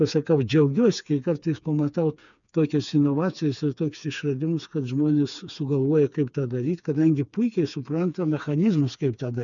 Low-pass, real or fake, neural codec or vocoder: 7.2 kHz; fake; codec, 16 kHz, 4 kbps, FreqCodec, smaller model